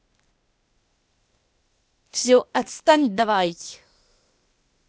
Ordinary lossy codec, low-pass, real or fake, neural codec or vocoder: none; none; fake; codec, 16 kHz, 0.8 kbps, ZipCodec